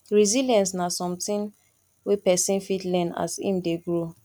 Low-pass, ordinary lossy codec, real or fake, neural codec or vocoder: 19.8 kHz; none; real; none